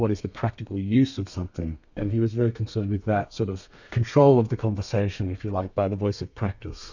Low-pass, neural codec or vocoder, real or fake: 7.2 kHz; codec, 32 kHz, 1.9 kbps, SNAC; fake